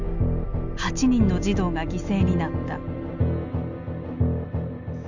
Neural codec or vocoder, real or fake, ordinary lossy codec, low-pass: none; real; none; 7.2 kHz